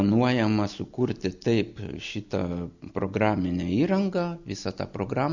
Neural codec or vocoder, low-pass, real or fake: none; 7.2 kHz; real